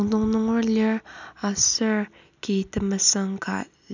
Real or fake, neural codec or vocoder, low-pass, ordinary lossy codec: real; none; 7.2 kHz; none